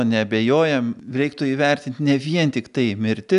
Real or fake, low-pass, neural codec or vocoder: real; 10.8 kHz; none